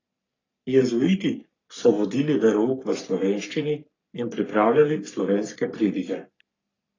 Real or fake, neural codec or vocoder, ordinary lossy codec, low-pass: fake; codec, 44.1 kHz, 3.4 kbps, Pupu-Codec; AAC, 32 kbps; 7.2 kHz